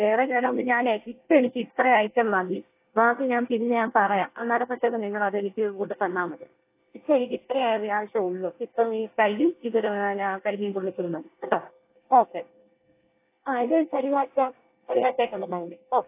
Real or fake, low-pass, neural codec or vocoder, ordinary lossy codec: fake; 3.6 kHz; codec, 24 kHz, 1 kbps, SNAC; AAC, 24 kbps